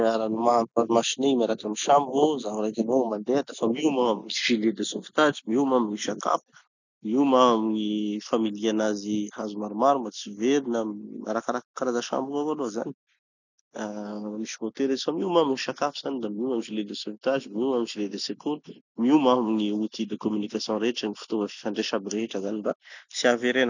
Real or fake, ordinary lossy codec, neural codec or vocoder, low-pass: real; none; none; 7.2 kHz